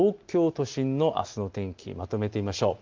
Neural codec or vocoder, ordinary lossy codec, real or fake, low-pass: none; Opus, 32 kbps; real; 7.2 kHz